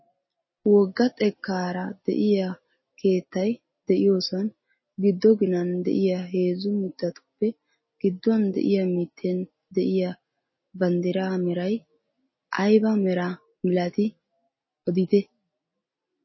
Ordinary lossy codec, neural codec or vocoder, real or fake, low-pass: MP3, 24 kbps; none; real; 7.2 kHz